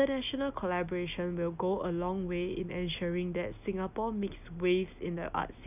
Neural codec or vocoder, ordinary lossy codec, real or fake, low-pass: none; none; real; 3.6 kHz